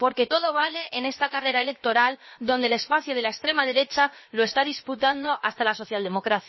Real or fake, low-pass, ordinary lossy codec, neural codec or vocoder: fake; 7.2 kHz; MP3, 24 kbps; codec, 16 kHz, about 1 kbps, DyCAST, with the encoder's durations